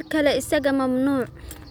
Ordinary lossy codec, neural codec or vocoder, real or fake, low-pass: none; none; real; none